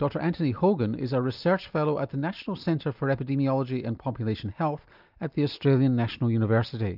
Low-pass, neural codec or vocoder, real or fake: 5.4 kHz; none; real